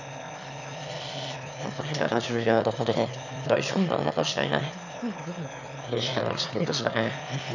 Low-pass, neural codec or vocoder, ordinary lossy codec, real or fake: 7.2 kHz; autoencoder, 22.05 kHz, a latent of 192 numbers a frame, VITS, trained on one speaker; none; fake